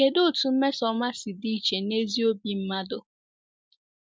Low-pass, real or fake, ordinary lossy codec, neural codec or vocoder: none; real; none; none